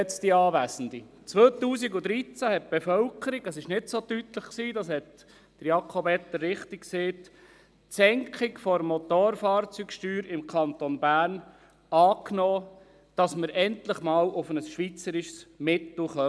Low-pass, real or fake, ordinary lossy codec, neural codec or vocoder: none; real; none; none